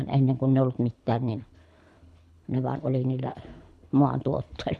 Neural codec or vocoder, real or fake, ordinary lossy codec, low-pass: codec, 24 kHz, 6 kbps, HILCodec; fake; none; none